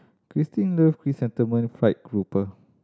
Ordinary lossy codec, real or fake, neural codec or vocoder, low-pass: none; real; none; none